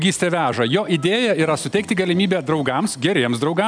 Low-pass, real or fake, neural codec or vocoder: 9.9 kHz; real; none